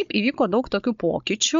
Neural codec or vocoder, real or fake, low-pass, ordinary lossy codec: codec, 16 kHz, 16 kbps, FunCodec, trained on Chinese and English, 50 frames a second; fake; 7.2 kHz; MP3, 64 kbps